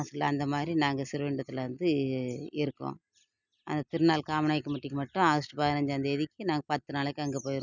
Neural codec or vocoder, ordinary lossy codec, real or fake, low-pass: none; none; real; 7.2 kHz